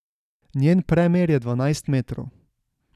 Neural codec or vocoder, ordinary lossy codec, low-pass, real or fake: none; none; 14.4 kHz; real